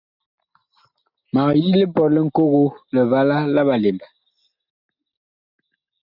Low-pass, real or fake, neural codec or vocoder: 5.4 kHz; real; none